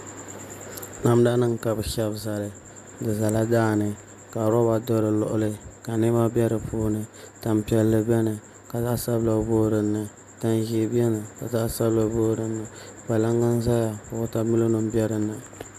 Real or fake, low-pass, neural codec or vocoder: real; 14.4 kHz; none